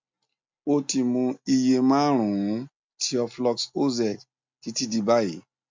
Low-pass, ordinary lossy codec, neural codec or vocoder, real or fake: 7.2 kHz; MP3, 64 kbps; none; real